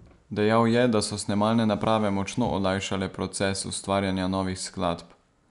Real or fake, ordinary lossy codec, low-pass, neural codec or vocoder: real; none; 10.8 kHz; none